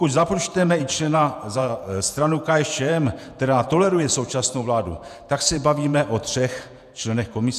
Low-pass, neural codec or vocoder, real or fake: 14.4 kHz; vocoder, 48 kHz, 128 mel bands, Vocos; fake